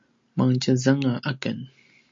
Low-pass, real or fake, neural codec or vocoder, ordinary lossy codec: 7.2 kHz; real; none; MP3, 48 kbps